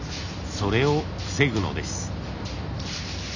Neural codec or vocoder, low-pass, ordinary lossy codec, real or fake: none; 7.2 kHz; none; real